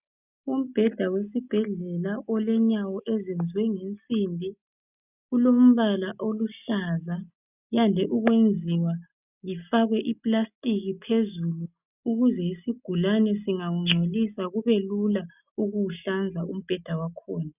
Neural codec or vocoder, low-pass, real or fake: none; 3.6 kHz; real